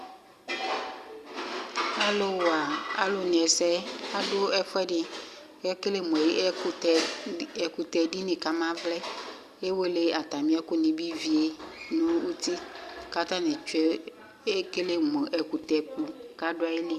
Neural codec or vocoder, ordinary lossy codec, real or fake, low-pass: none; Opus, 64 kbps; real; 14.4 kHz